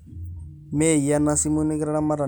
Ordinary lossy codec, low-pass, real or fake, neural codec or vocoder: none; none; real; none